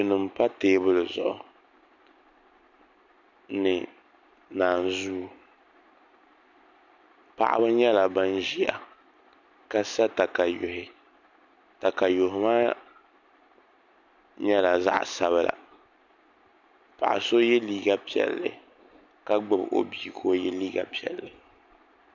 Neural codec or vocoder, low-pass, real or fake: none; 7.2 kHz; real